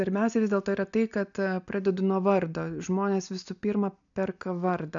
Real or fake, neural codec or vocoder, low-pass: real; none; 7.2 kHz